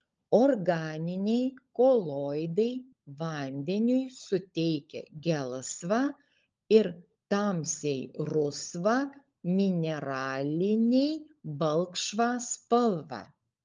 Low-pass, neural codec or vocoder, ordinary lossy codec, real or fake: 7.2 kHz; codec, 16 kHz, 16 kbps, FunCodec, trained on LibriTTS, 50 frames a second; Opus, 24 kbps; fake